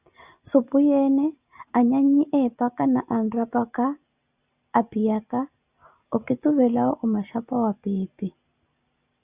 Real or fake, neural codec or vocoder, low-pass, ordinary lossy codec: real; none; 3.6 kHz; Opus, 64 kbps